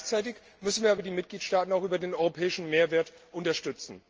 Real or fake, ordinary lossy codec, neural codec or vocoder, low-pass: real; Opus, 24 kbps; none; 7.2 kHz